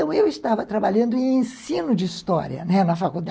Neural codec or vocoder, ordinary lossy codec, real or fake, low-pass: none; none; real; none